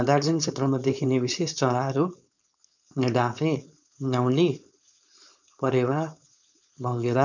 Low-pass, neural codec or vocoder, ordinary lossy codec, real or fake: 7.2 kHz; codec, 16 kHz, 4.8 kbps, FACodec; none; fake